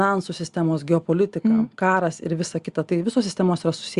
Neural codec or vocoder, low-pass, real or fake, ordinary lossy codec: none; 10.8 kHz; real; Opus, 64 kbps